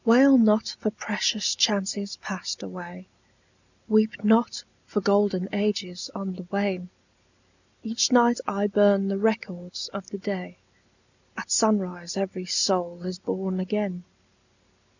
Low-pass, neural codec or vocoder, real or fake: 7.2 kHz; none; real